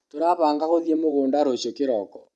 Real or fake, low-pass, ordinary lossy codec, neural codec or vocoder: fake; none; none; vocoder, 24 kHz, 100 mel bands, Vocos